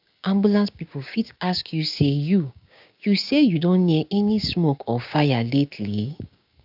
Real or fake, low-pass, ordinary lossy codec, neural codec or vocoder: fake; 5.4 kHz; AAC, 48 kbps; codec, 16 kHz, 6 kbps, DAC